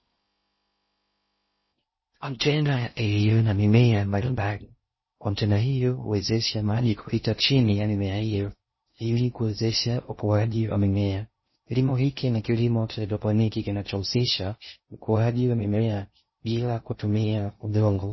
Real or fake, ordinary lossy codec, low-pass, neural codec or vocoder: fake; MP3, 24 kbps; 7.2 kHz; codec, 16 kHz in and 24 kHz out, 0.6 kbps, FocalCodec, streaming, 4096 codes